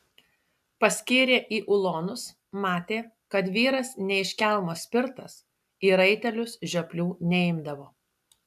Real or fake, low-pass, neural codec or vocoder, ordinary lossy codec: real; 14.4 kHz; none; AAC, 96 kbps